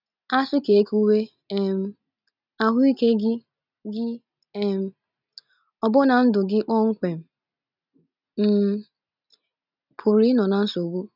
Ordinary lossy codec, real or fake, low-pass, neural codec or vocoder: none; real; 5.4 kHz; none